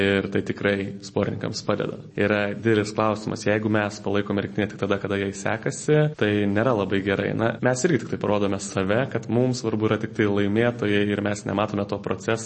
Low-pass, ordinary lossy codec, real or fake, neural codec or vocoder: 10.8 kHz; MP3, 32 kbps; real; none